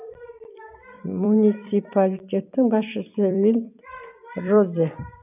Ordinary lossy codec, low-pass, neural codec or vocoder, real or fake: none; 3.6 kHz; vocoder, 44.1 kHz, 128 mel bands every 256 samples, BigVGAN v2; fake